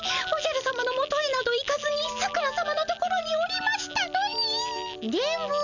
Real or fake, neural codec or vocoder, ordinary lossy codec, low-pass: real; none; none; 7.2 kHz